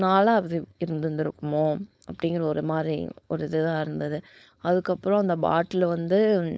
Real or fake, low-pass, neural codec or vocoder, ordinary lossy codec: fake; none; codec, 16 kHz, 4.8 kbps, FACodec; none